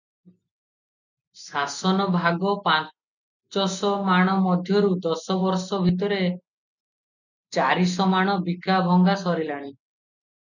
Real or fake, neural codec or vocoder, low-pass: real; none; 7.2 kHz